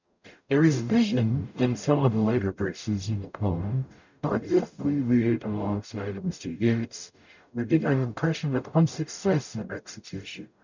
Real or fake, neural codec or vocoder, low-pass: fake; codec, 44.1 kHz, 0.9 kbps, DAC; 7.2 kHz